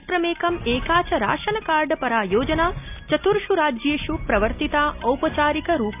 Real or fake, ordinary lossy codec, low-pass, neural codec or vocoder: real; none; 3.6 kHz; none